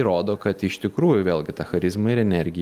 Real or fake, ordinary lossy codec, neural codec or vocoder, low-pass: real; Opus, 32 kbps; none; 14.4 kHz